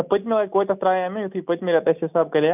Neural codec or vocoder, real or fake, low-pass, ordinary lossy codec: none; real; 3.6 kHz; none